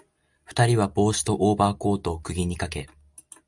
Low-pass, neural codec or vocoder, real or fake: 10.8 kHz; none; real